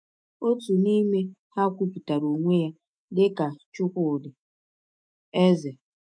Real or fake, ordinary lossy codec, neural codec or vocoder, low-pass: fake; none; autoencoder, 48 kHz, 128 numbers a frame, DAC-VAE, trained on Japanese speech; 9.9 kHz